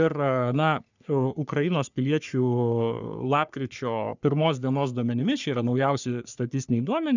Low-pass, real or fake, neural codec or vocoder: 7.2 kHz; fake; codec, 44.1 kHz, 3.4 kbps, Pupu-Codec